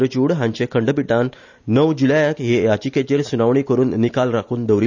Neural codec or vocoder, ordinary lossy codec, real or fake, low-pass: none; none; real; 7.2 kHz